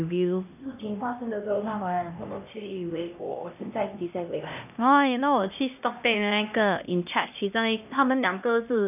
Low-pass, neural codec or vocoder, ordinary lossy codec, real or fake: 3.6 kHz; codec, 16 kHz, 1 kbps, X-Codec, HuBERT features, trained on LibriSpeech; none; fake